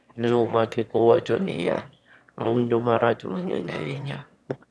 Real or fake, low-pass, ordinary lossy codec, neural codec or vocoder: fake; none; none; autoencoder, 22.05 kHz, a latent of 192 numbers a frame, VITS, trained on one speaker